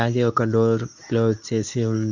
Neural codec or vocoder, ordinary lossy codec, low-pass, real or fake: codec, 24 kHz, 0.9 kbps, WavTokenizer, medium speech release version 2; none; 7.2 kHz; fake